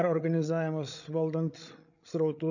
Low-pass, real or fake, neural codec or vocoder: 7.2 kHz; fake; codec, 16 kHz, 16 kbps, FreqCodec, larger model